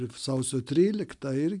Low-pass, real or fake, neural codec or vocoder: 10.8 kHz; real; none